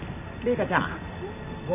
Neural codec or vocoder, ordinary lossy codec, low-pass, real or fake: none; none; 3.6 kHz; real